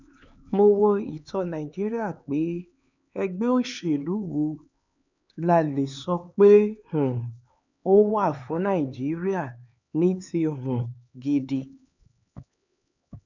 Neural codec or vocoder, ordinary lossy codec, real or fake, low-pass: codec, 16 kHz, 4 kbps, X-Codec, HuBERT features, trained on LibriSpeech; none; fake; 7.2 kHz